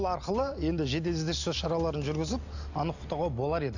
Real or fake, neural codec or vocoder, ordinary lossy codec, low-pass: real; none; none; 7.2 kHz